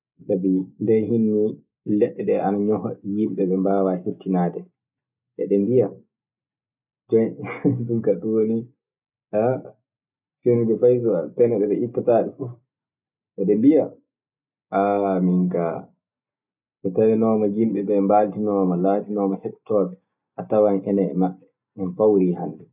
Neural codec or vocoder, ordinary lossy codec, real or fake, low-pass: none; none; real; 3.6 kHz